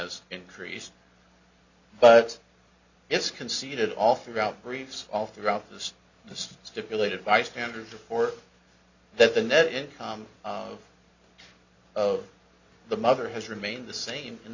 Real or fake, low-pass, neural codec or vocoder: real; 7.2 kHz; none